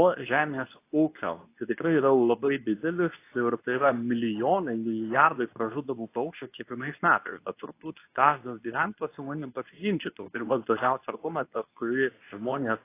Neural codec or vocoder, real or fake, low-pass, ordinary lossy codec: codec, 24 kHz, 0.9 kbps, WavTokenizer, medium speech release version 2; fake; 3.6 kHz; AAC, 24 kbps